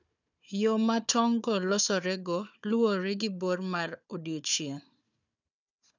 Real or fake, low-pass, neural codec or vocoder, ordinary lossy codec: fake; 7.2 kHz; codec, 16 kHz, 4 kbps, FunCodec, trained on Chinese and English, 50 frames a second; none